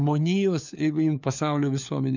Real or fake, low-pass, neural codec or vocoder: fake; 7.2 kHz; codec, 16 kHz, 4 kbps, FunCodec, trained on LibriTTS, 50 frames a second